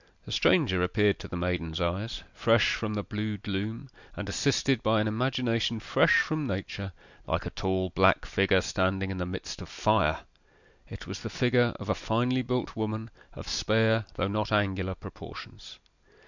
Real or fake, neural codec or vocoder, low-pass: real; none; 7.2 kHz